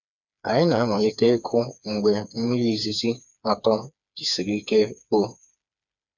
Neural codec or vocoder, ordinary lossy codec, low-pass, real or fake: codec, 16 kHz, 4 kbps, FreqCodec, smaller model; none; 7.2 kHz; fake